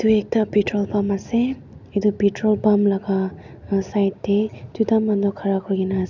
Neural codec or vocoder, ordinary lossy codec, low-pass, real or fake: none; none; 7.2 kHz; real